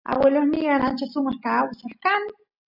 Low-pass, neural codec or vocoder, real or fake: 5.4 kHz; none; real